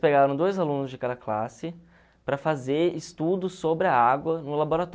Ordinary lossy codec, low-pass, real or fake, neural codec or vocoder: none; none; real; none